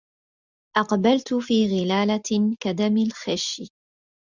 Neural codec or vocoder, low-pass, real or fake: none; 7.2 kHz; real